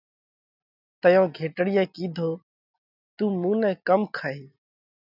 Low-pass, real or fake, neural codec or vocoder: 5.4 kHz; real; none